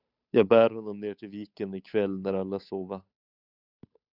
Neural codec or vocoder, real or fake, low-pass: codec, 16 kHz, 8 kbps, FunCodec, trained on Chinese and English, 25 frames a second; fake; 5.4 kHz